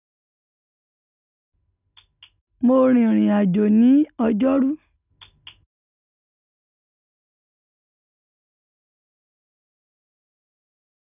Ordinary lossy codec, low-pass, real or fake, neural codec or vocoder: none; 3.6 kHz; real; none